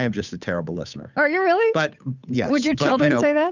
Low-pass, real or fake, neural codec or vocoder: 7.2 kHz; fake; codec, 16 kHz, 8 kbps, FunCodec, trained on Chinese and English, 25 frames a second